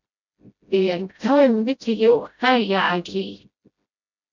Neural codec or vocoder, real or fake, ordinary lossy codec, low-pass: codec, 16 kHz, 0.5 kbps, FreqCodec, smaller model; fake; none; 7.2 kHz